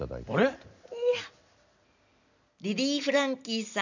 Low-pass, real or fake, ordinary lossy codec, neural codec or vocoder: 7.2 kHz; real; none; none